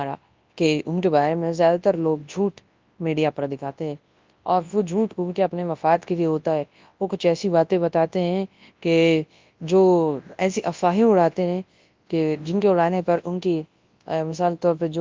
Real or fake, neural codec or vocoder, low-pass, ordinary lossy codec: fake; codec, 24 kHz, 0.9 kbps, WavTokenizer, large speech release; 7.2 kHz; Opus, 24 kbps